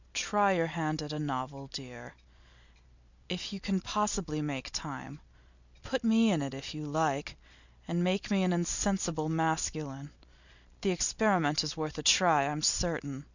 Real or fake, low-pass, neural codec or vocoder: real; 7.2 kHz; none